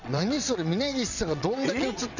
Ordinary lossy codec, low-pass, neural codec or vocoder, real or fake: none; 7.2 kHz; vocoder, 22.05 kHz, 80 mel bands, WaveNeXt; fake